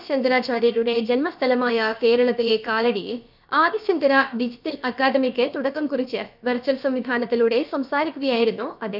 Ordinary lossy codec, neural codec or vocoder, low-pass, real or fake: none; codec, 16 kHz, about 1 kbps, DyCAST, with the encoder's durations; 5.4 kHz; fake